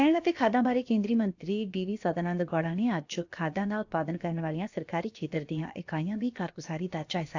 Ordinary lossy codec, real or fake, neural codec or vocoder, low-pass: AAC, 48 kbps; fake; codec, 16 kHz, about 1 kbps, DyCAST, with the encoder's durations; 7.2 kHz